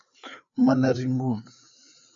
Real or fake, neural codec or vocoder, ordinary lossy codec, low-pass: fake; codec, 16 kHz, 4 kbps, FreqCodec, larger model; AAC, 64 kbps; 7.2 kHz